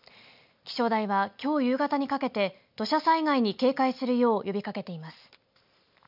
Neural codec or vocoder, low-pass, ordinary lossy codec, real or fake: none; 5.4 kHz; none; real